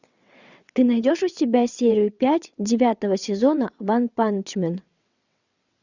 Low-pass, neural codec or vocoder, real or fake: 7.2 kHz; vocoder, 22.05 kHz, 80 mel bands, WaveNeXt; fake